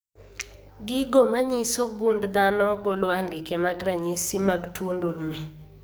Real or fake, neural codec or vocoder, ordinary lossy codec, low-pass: fake; codec, 44.1 kHz, 2.6 kbps, SNAC; none; none